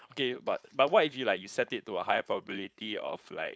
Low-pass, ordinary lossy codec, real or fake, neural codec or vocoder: none; none; fake; codec, 16 kHz, 4.8 kbps, FACodec